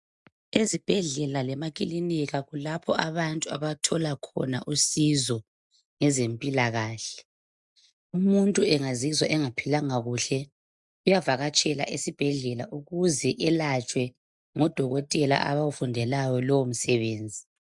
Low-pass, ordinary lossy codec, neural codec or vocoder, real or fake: 10.8 kHz; MP3, 96 kbps; none; real